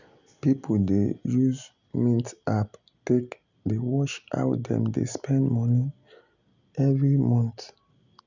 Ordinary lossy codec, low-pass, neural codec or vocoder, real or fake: none; 7.2 kHz; none; real